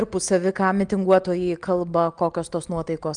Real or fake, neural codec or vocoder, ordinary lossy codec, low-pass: real; none; Opus, 32 kbps; 9.9 kHz